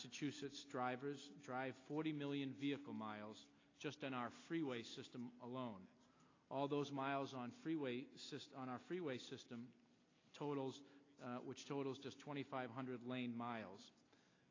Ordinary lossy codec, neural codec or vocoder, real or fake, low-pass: AAC, 32 kbps; none; real; 7.2 kHz